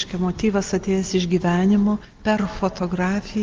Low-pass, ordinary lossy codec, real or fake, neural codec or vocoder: 7.2 kHz; Opus, 32 kbps; real; none